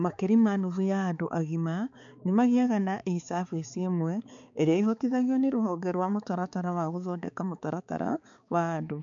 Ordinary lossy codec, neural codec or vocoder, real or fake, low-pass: MP3, 64 kbps; codec, 16 kHz, 4 kbps, X-Codec, HuBERT features, trained on balanced general audio; fake; 7.2 kHz